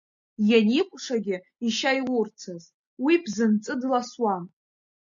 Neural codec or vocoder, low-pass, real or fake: none; 7.2 kHz; real